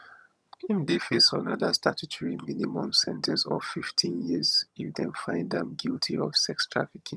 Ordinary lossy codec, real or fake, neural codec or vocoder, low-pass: none; fake; vocoder, 22.05 kHz, 80 mel bands, HiFi-GAN; none